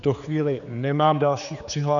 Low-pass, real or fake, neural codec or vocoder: 7.2 kHz; fake; codec, 16 kHz, 4 kbps, X-Codec, HuBERT features, trained on general audio